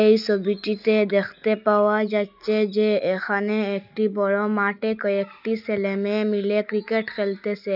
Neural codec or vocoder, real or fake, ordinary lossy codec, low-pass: none; real; none; 5.4 kHz